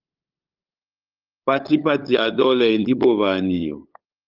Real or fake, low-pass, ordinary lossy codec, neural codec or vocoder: fake; 5.4 kHz; Opus, 24 kbps; codec, 16 kHz, 8 kbps, FunCodec, trained on LibriTTS, 25 frames a second